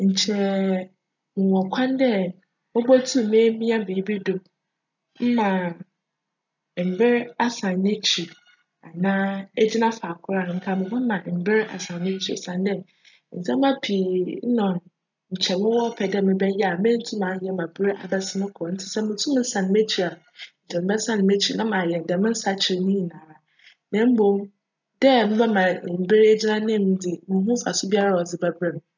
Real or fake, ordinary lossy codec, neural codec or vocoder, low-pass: real; none; none; 7.2 kHz